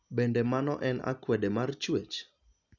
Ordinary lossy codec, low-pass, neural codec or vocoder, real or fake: none; 7.2 kHz; none; real